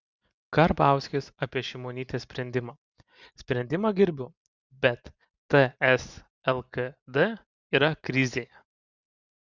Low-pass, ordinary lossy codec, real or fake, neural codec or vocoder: 7.2 kHz; Opus, 64 kbps; real; none